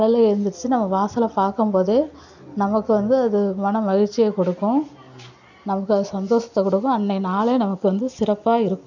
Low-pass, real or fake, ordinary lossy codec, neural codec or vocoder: 7.2 kHz; real; none; none